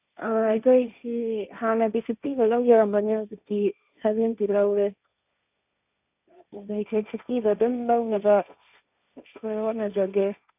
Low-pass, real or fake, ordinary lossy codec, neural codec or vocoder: 3.6 kHz; fake; none; codec, 16 kHz, 1.1 kbps, Voila-Tokenizer